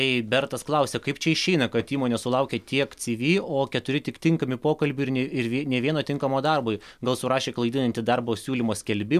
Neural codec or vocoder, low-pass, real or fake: none; 14.4 kHz; real